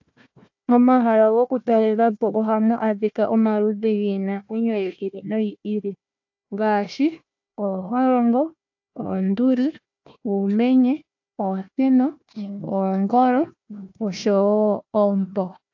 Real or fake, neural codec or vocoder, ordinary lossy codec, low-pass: fake; codec, 16 kHz, 1 kbps, FunCodec, trained on Chinese and English, 50 frames a second; AAC, 48 kbps; 7.2 kHz